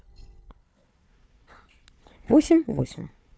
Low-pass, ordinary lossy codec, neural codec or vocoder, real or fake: none; none; codec, 16 kHz, 4 kbps, FunCodec, trained on Chinese and English, 50 frames a second; fake